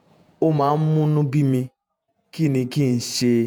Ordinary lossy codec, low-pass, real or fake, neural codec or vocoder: none; none; fake; vocoder, 48 kHz, 128 mel bands, Vocos